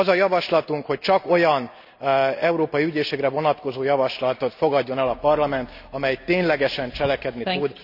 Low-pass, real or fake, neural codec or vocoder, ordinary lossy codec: 5.4 kHz; real; none; none